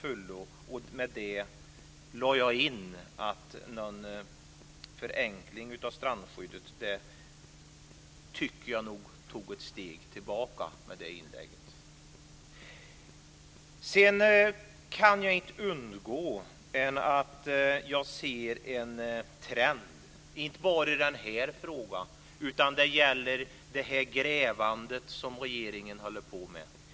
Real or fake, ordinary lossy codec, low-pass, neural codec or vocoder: real; none; none; none